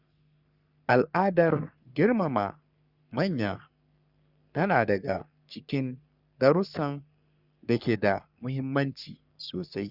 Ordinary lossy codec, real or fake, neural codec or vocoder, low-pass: Opus, 64 kbps; fake; codec, 44.1 kHz, 3.4 kbps, Pupu-Codec; 5.4 kHz